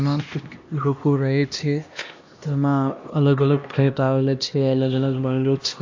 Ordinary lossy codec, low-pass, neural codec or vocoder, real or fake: AAC, 48 kbps; 7.2 kHz; codec, 16 kHz, 1 kbps, X-Codec, HuBERT features, trained on LibriSpeech; fake